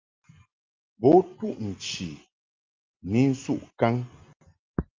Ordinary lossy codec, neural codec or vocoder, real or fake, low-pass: Opus, 24 kbps; none; real; 7.2 kHz